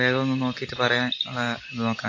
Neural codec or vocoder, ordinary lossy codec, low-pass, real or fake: none; AAC, 32 kbps; 7.2 kHz; real